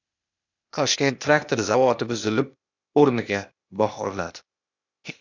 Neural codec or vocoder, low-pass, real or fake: codec, 16 kHz, 0.8 kbps, ZipCodec; 7.2 kHz; fake